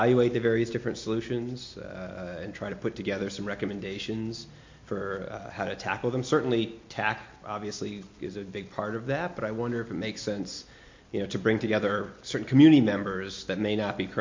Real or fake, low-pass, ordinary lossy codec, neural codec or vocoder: real; 7.2 kHz; MP3, 48 kbps; none